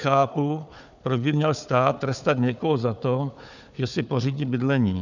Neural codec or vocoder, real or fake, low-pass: codec, 16 kHz, 4 kbps, FunCodec, trained on Chinese and English, 50 frames a second; fake; 7.2 kHz